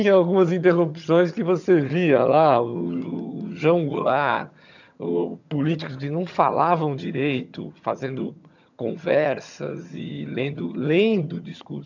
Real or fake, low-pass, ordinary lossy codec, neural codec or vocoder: fake; 7.2 kHz; none; vocoder, 22.05 kHz, 80 mel bands, HiFi-GAN